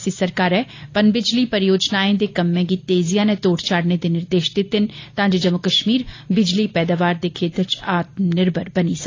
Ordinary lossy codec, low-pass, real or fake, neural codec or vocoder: AAC, 32 kbps; 7.2 kHz; real; none